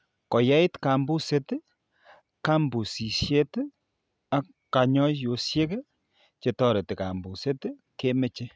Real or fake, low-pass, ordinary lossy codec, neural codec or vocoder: real; none; none; none